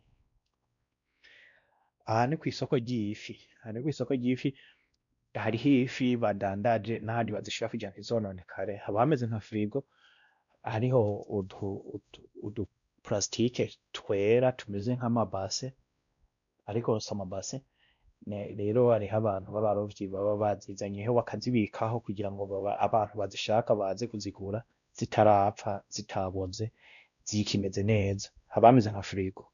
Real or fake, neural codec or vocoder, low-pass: fake; codec, 16 kHz, 1 kbps, X-Codec, WavLM features, trained on Multilingual LibriSpeech; 7.2 kHz